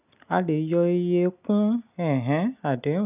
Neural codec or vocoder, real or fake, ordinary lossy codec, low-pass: none; real; none; 3.6 kHz